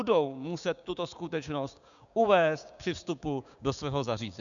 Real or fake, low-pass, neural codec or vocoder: fake; 7.2 kHz; codec, 16 kHz, 6 kbps, DAC